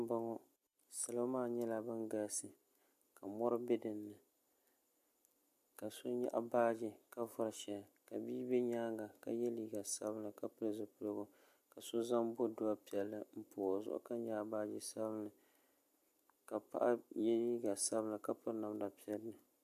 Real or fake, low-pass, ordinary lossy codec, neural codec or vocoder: real; 14.4 kHz; MP3, 64 kbps; none